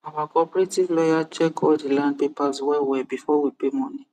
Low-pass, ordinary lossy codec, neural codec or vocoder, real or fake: 14.4 kHz; none; vocoder, 44.1 kHz, 128 mel bands every 256 samples, BigVGAN v2; fake